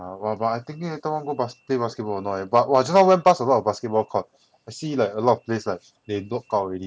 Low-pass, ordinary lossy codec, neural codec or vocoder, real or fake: none; none; none; real